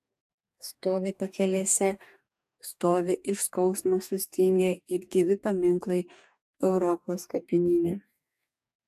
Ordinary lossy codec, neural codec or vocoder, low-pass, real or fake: AAC, 96 kbps; codec, 44.1 kHz, 2.6 kbps, DAC; 14.4 kHz; fake